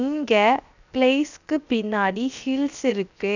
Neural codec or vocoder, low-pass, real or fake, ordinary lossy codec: codec, 16 kHz, 0.7 kbps, FocalCodec; 7.2 kHz; fake; none